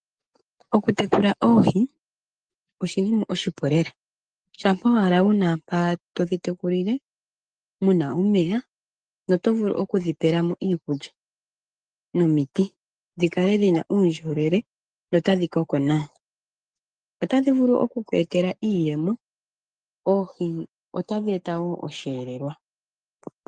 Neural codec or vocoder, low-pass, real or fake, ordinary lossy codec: codec, 44.1 kHz, 7.8 kbps, DAC; 9.9 kHz; fake; Opus, 32 kbps